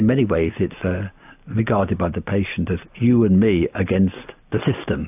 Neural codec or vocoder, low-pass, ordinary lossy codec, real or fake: none; 3.6 kHz; AAC, 32 kbps; real